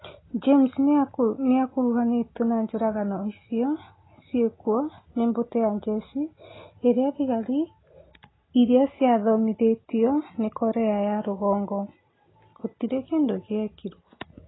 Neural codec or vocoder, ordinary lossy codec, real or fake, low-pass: none; AAC, 16 kbps; real; 7.2 kHz